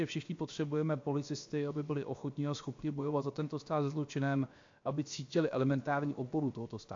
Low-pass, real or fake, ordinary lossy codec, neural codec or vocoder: 7.2 kHz; fake; AAC, 48 kbps; codec, 16 kHz, about 1 kbps, DyCAST, with the encoder's durations